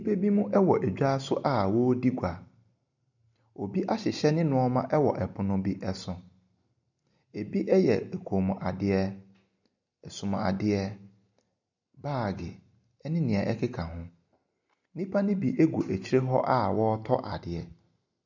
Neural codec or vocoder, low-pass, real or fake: none; 7.2 kHz; real